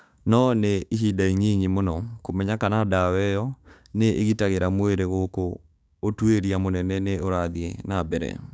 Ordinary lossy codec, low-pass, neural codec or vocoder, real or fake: none; none; codec, 16 kHz, 6 kbps, DAC; fake